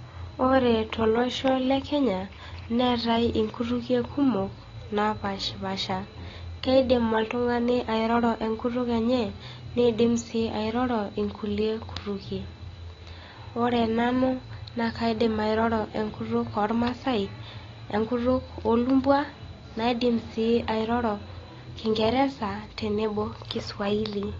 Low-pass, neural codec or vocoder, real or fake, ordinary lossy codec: 7.2 kHz; none; real; AAC, 32 kbps